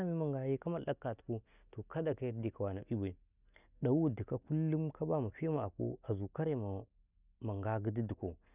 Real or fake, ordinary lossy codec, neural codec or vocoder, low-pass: real; none; none; 3.6 kHz